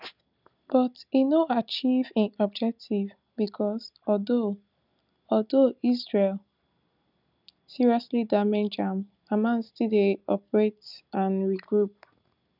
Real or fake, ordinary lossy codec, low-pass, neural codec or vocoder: real; none; 5.4 kHz; none